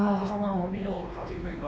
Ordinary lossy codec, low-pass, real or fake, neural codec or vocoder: none; none; fake; codec, 16 kHz, 2 kbps, X-Codec, WavLM features, trained on Multilingual LibriSpeech